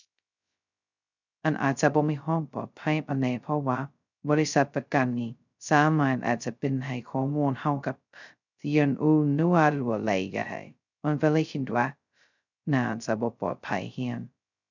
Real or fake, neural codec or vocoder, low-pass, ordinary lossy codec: fake; codec, 16 kHz, 0.2 kbps, FocalCodec; 7.2 kHz; none